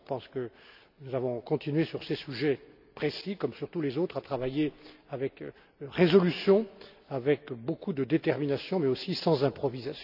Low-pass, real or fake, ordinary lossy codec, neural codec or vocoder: 5.4 kHz; real; none; none